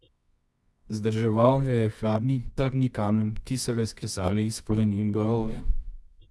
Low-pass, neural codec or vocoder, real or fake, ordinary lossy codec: none; codec, 24 kHz, 0.9 kbps, WavTokenizer, medium music audio release; fake; none